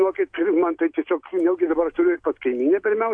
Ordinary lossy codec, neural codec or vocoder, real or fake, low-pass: AAC, 48 kbps; none; real; 9.9 kHz